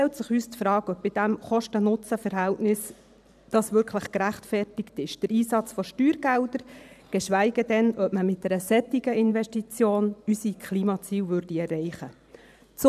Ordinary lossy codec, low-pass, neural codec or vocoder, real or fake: none; 14.4 kHz; vocoder, 44.1 kHz, 128 mel bands every 512 samples, BigVGAN v2; fake